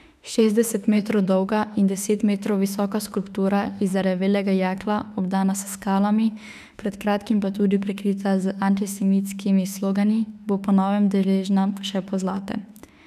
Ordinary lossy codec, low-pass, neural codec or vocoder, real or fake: none; 14.4 kHz; autoencoder, 48 kHz, 32 numbers a frame, DAC-VAE, trained on Japanese speech; fake